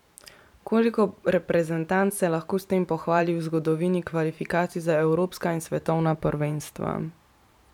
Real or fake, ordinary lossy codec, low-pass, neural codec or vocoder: fake; none; 19.8 kHz; vocoder, 44.1 kHz, 128 mel bands every 512 samples, BigVGAN v2